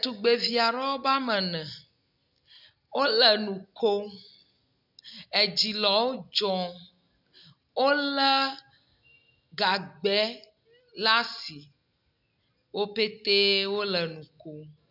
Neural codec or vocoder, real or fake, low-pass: none; real; 5.4 kHz